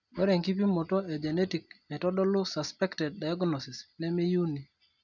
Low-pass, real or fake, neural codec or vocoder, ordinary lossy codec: 7.2 kHz; real; none; none